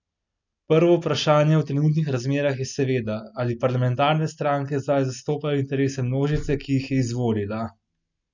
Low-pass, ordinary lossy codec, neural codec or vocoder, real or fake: 7.2 kHz; none; none; real